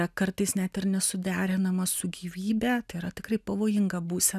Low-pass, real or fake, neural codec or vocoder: 14.4 kHz; real; none